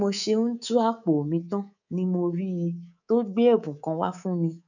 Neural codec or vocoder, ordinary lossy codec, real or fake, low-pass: codec, 24 kHz, 3.1 kbps, DualCodec; none; fake; 7.2 kHz